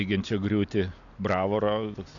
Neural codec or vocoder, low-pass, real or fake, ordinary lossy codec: none; 7.2 kHz; real; AAC, 64 kbps